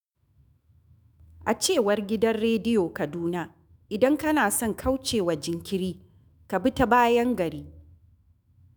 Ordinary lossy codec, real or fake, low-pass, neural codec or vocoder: none; fake; none; autoencoder, 48 kHz, 128 numbers a frame, DAC-VAE, trained on Japanese speech